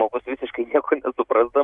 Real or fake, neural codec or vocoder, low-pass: real; none; 10.8 kHz